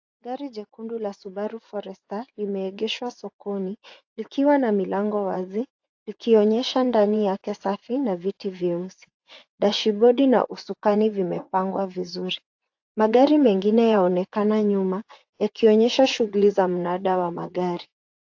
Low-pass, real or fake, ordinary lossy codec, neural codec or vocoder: 7.2 kHz; fake; AAC, 48 kbps; vocoder, 24 kHz, 100 mel bands, Vocos